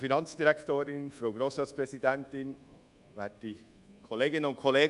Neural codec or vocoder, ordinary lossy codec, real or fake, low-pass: codec, 24 kHz, 1.2 kbps, DualCodec; none; fake; 10.8 kHz